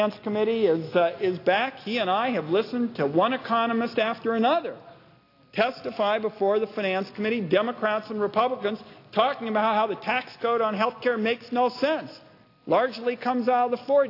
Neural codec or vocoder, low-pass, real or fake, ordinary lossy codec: none; 5.4 kHz; real; AAC, 32 kbps